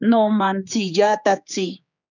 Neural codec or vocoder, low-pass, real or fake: codec, 16 kHz, 4 kbps, X-Codec, HuBERT features, trained on general audio; 7.2 kHz; fake